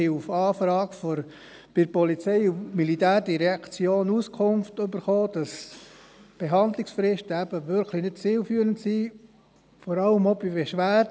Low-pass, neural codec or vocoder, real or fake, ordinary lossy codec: none; none; real; none